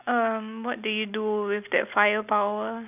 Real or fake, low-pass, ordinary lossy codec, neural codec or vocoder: real; 3.6 kHz; none; none